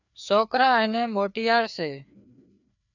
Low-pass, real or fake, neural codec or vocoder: 7.2 kHz; fake; codec, 16 kHz, 2 kbps, FreqCodec, larger model